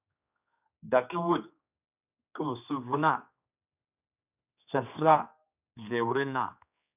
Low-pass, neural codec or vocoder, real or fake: 3.6 kHz; codec, 16 kHz, 2 kbps, X-Codec, HuBERT features, trained on general audio; fake